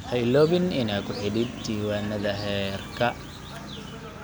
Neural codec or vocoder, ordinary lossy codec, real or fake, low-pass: none; none; real; none